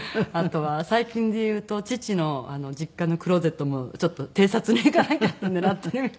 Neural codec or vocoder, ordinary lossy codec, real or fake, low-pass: none; none; real; none